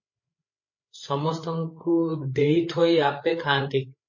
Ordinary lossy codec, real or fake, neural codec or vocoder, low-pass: MP3, 32 kbps; fake; codec, 16 kHz, 8 kbps, FreqCodec, larger model; 7.2 kHz